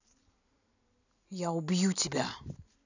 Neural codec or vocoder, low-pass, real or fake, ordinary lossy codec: vocoder, 44.1 kHz, 128 mel bands every 256 samples, BigVGAN v2; 7.2 kHz; fake; none